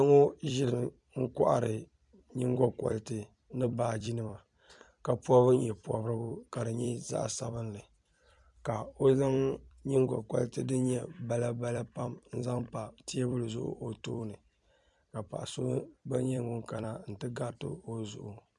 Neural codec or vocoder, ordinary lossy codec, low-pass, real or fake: vocoder, 44.1 kHz, 128 mel bands every 256 samples, BigVGAN v2; MP3, 96 kbps; 10.8 kHz; fake